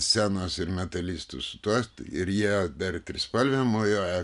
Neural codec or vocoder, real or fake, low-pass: none; real; 10.8 kHz